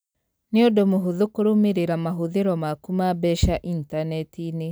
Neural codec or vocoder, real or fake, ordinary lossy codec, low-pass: none; real; none; none